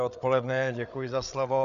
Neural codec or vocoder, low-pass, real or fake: codec, 16 kHz, 8 kbps, FreqCodec, larger model; 7.2 kHz; fake